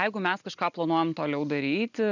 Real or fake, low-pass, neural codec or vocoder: real; 7.2 kHz; none